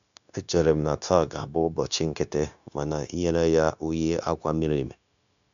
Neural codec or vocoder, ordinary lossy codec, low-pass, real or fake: codec, 16 kHz, 0.9 kbps, LongCat-Audio-Codec; none; 7.2 kHz; fake